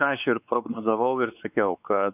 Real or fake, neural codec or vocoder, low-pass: fake; codec, 16 kHz, 4 kbps, X-Codec, WavLM features, trained on Multilingual LibriSpeech; 3.6 kHz